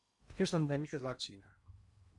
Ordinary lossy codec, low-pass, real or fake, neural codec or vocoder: AAC, 64 kbps; 10.8 kHz; fake; codec, 16 kHz in and 24 kHz out, 0.8 kbps, FocalCodec, streaming, 65536 codes